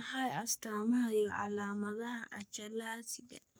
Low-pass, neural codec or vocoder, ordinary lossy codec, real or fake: none; codec, 44.1 kHz, 3.4 kbps, Pupu-Codec; none; fake